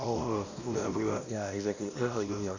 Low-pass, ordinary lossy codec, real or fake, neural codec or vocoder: 7.2 kHz; none; fake; codec, 16 kHz, 1 kbps, X-Codec, WavLM features, trained on Multilingual LibriSpeech